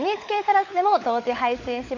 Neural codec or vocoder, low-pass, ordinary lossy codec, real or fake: codec, 16 kHz, 16 kbps, FunCodec, trained on LibriTTS, 50 frames a second; 7.2 kHz; AAC, 48 kbps; fake